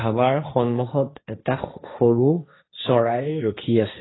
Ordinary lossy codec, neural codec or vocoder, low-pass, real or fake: AAC, 16 kbps; codec, 16 kHz, 1.1 kbps, Voila-Tokenizer; 7.2 kHz; fake